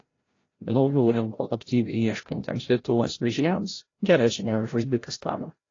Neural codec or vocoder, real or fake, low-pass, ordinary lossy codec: codec, 16 kHz, 0.5 kbps, FreqCodec, larger model; fake; 7.2 kHz; AAC, 32 kbps